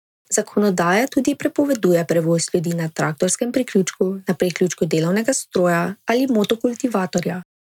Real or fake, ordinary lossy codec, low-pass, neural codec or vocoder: real; none; 19.8 kHz; none